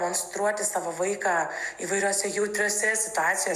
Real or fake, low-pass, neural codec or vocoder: real; 14.4 kHz; none